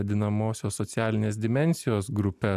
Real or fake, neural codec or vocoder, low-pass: real; none; 14.4 kHz